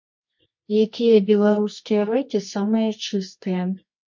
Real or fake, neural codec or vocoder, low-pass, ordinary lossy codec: fake; codec, 24 kHz, 0.9 kbps, WavTokenizer, medium music audio release; 7.2 kHz; MP3, 48 kbps